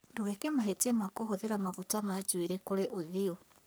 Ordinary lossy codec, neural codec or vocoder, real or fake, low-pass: none; codec, 44.1 kHz, 3.4 kbps, Pupu-Codec; fake; none